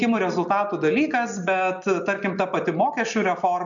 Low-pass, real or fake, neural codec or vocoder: 7.2 kHz; real; none